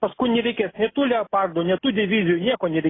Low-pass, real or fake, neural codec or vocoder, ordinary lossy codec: 7.2 kHz; real; none; AAC, 16 kbps